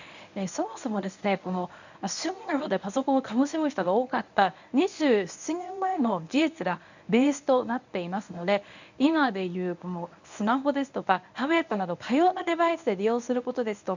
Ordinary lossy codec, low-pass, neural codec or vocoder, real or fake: none; 7.2 kHz; codec, 24 kHz, 0.9 kbps, WavTokenizer, medium speech release version 1; fake